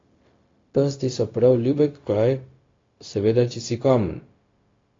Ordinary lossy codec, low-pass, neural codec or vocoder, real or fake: AAC, 32 kbps; 7.2 kHz; codec, 16 kHz, 0.4 kbps, LongCat-Audio-Codec; fake